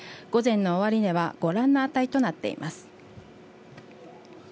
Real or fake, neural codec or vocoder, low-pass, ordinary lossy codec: real; none; none; none